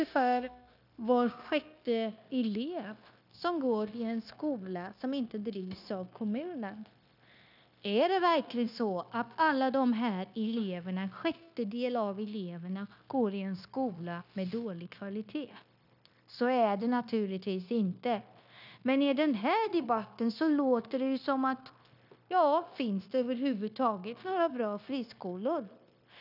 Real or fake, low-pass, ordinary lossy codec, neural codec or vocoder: fake; 5.4 kHz; none; codec, 16 kHz, 0.9 kbps, LongCat-Audio-Codec